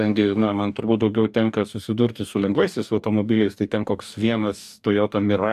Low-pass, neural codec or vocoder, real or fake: 14.4 kHz; codec, 44.1 kHz, 2.6 kbps, DAC; fake